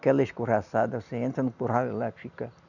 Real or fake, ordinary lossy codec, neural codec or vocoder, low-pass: real; none; none; 7.2 kHz